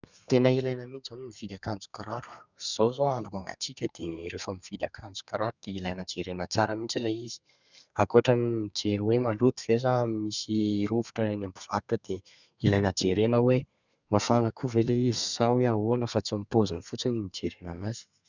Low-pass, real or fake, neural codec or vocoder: 7.2 kHz; fake; codec, 32 kHz, 1.9 kbps, SNAC